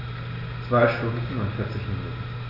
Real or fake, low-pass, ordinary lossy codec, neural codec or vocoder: real; 5.4 kHz; none; none